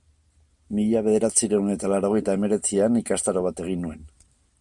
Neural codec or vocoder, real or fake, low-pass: none; real; 10.8 kHz